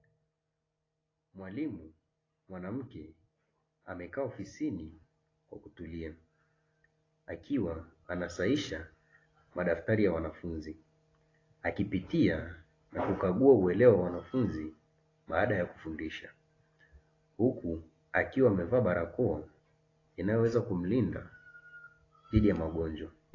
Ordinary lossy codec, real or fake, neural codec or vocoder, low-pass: AAC, 32 kbps; real; none; 7.2 kHz